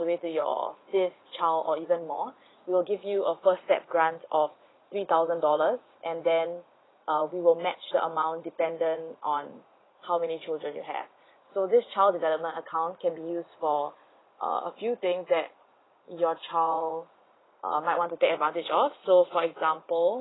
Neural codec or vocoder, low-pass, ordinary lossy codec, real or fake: vocoder, 44.1 kHz, 80 mel bands, Vocos; 7.2 kHz; AAC, 16 kbps; fake